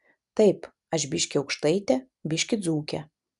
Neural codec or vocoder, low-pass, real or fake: none; 10.8 kHz; real